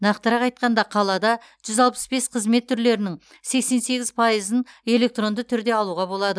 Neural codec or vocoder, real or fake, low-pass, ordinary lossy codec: none; real; none; none